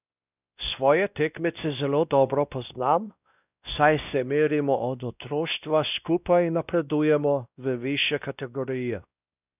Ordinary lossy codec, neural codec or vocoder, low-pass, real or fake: none; codec, 16 kHz, 1 kbps, X-Codec, WavLM features, trained on Multilingual LibriSpeech; 3.6 kHz; fake